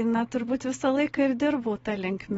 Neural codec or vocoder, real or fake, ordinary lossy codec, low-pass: none; real; AAC, 24 kbps; 19.8 kHz